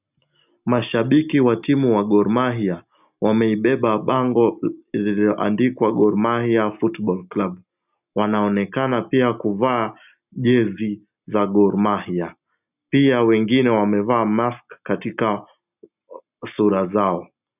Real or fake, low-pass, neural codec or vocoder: real; 3.6 kHz; none